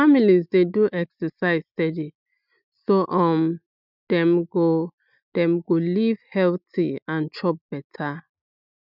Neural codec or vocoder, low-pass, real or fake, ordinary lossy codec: none; 5.4 kHz; real; none